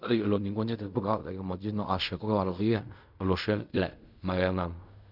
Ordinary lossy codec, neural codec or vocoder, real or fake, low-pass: none; codec, 16 kHz in and 24 kHz out, 0.4 kbps, LongCat-Audio-Codec, fine tuned four codebook decoder; fake; 5.4 kHz